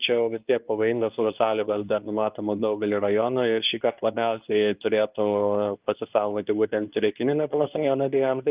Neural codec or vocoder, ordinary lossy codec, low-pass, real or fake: codec, 24 kHz, 0.9 kbps, WavTokenizer, medium speech release version 2; Opus, 24 kbps; 3.6 kHz; fake